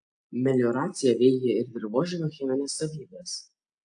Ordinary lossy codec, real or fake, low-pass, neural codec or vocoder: AAC, 64 kbps; real; 9.9 kHz; none